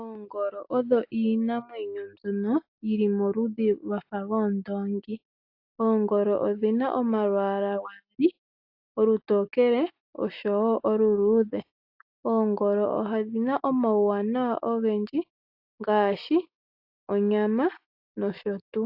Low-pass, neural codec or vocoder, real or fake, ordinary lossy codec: 5.4 kHz; none; real; AAC, 48 kbps